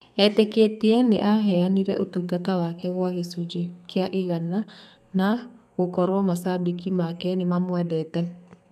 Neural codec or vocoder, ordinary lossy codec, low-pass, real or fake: codec, 32 kHz, 1.9 kbps, SNAC; none; 14.4 kHz; fake